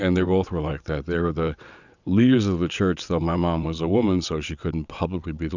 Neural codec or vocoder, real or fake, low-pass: vocoder, 22.05 kHz, 80 mel bands, WaveNeXt; fake; 7.2 kHz